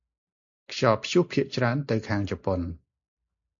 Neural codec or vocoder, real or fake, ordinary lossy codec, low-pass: none; real; AAC, 48 kbps; 7.2 kHz